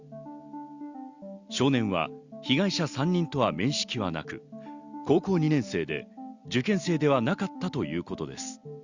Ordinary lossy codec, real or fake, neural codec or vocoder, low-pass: Opus, 64 kbps; real; none; 7.2 kHz